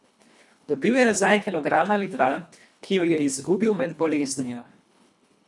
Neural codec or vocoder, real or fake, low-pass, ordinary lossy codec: codec, 24 kHz, 1.5 kbps, HILCodec; fake; none; none